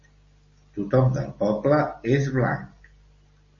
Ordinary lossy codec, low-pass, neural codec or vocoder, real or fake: MP3, 32 kbps; 7.2 kHz; none; real